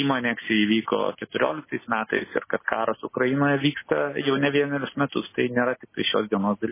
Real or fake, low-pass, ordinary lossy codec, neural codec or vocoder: real; 3.6 kHz; MP3, 16 kbps; none